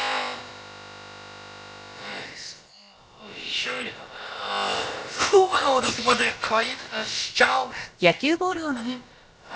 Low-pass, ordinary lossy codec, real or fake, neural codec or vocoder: none; none; fake; codec, 16 kHz, about 1 kbps, DyCAST, with the encoder's durations